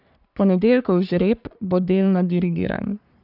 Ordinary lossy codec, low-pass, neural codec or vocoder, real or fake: none; 5.4 kHz; codec, 44.1 kHz, 3.4 kbps, Pupu-Codec; fake